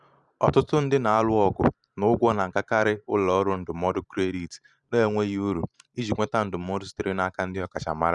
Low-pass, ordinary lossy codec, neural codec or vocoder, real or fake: 10.8 kHz; none; vocoder, 44.1 kHz, 128 mel bands every 512 samples, BigVGAN v2; fake